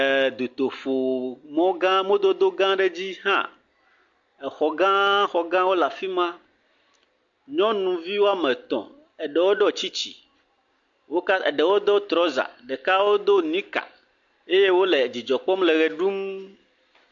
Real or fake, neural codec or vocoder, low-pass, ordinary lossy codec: real; none; 7.2 kHz; MP3, 48 kbps